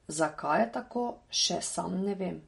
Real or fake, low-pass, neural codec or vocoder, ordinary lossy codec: real; 19.8 kHz; none; MP3, 48 kbps